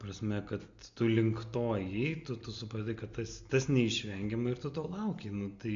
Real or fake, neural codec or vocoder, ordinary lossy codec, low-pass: real; none; MP3, 64 kbps; 7.2 kHz